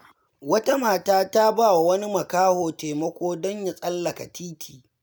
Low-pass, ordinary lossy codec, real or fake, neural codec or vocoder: none; none; real; none